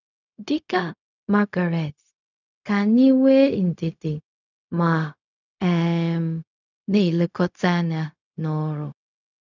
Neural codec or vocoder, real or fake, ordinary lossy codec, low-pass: codec, 16 kHz, 0.4 kbps, LongCat-Audio-Codec; fake; none; 7.2 kHz